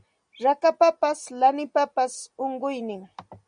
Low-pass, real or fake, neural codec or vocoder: 9.9 kHz; real; none